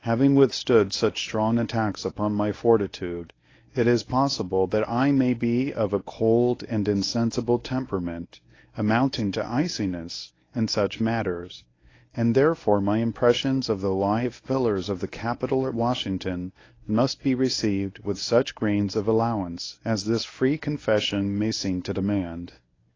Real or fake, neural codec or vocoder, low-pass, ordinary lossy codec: fake; codec, 24 kHz, 0.9 kbps, WavTokenizer, medium speech release version 1; 7.2 kHz; AAC, 32 kbps